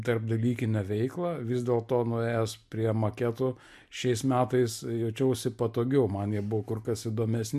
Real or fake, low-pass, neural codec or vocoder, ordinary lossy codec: real; 14.4 kHz; none; MP3, 64 kbps